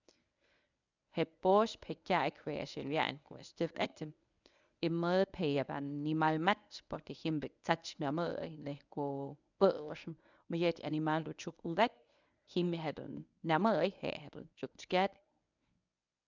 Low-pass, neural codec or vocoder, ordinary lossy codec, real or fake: 7.2 kHz; codec, 24 kHz, 0.9 kbps, WavTokenizer, medium speech release version 1; none; fake